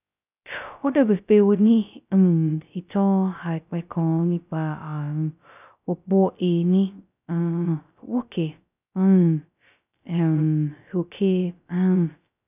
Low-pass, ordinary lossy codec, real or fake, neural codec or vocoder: 3.6 kHz; none; fake; codec, 16 kHz, 0.2 kbps, FocalCodec